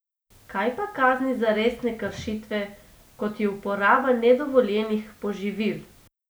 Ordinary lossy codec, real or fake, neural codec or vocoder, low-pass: none; real; none; none